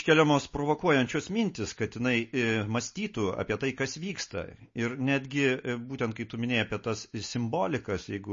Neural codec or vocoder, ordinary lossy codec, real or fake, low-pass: none; MP3, 32 kbps; real; 7.2 kHz